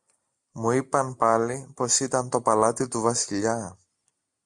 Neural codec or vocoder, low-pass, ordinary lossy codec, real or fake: none; 10.8 kHz; MP3, 96 kbps; real